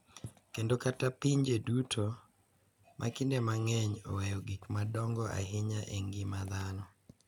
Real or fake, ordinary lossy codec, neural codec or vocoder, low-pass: real; none; none; 19.8 kHz